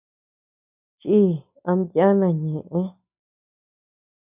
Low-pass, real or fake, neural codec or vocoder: 3.6 kHz; real; none